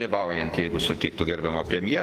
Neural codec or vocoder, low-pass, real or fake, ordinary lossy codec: codec, 44.1 kHz, 2.6 kbps, SNAC; 14.4 kHz; fake; Opus, 24 kbps